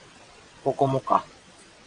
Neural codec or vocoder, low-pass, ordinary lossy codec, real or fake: vocoder, 22.05 kHz, 80 mel bands, WaveNeXt; 9.9 kHz; AAC, 48 kbps; fake